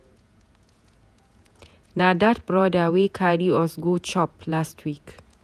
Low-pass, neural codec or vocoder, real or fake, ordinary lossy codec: 14.4 kHz; vocoder, 48 kHz, 128 mel bands, Vocos; fake; none